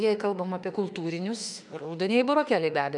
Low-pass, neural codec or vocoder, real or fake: 10.8 kHz; autoencoder, 48 kHz, 32 numbers a frame, DAC-VAE, trained on Japanese speech; fake